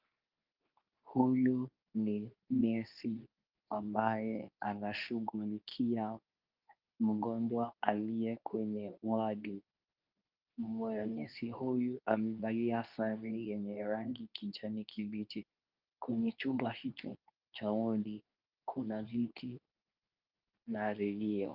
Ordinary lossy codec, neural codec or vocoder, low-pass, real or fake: Opus, 24 kbps; codec, 24 kHz, 0.9 kbps, WavTokenizer, medium speech release version 2; 5.4 kHz; fake